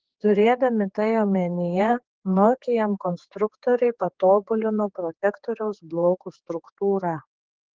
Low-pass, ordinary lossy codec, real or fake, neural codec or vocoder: 7.2 kHz; Opus, 16 kbps; fake; codec, 16 kHz, 4 kbps, X-Codec, HuBERT features, trained on general audio